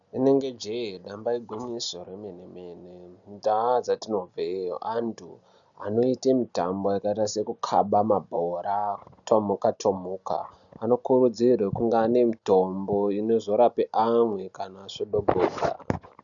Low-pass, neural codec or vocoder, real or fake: 7.2 kHz; none; real